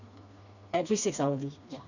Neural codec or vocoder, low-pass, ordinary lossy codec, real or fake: codec, 16 kHz, 2 kbps, FreqCodec, smaller model; 7.2 kHz; none; fake